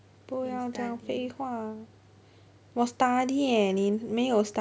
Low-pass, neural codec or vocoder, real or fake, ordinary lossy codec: none; none; real; none